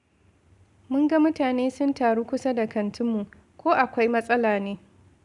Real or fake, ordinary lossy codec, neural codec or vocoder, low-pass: real; none; none; 10.8 kHz